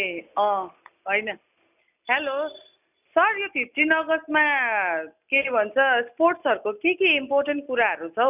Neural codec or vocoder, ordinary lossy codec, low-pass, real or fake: none; none; 3.6 kHz; real